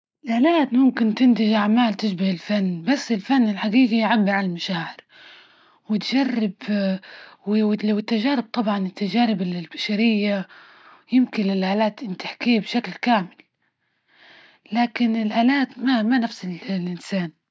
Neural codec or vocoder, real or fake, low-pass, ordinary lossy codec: none; real; none; none